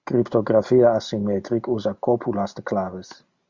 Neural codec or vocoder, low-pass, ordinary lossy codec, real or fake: none; 7.2 kHz; Opus, 64 kbps; real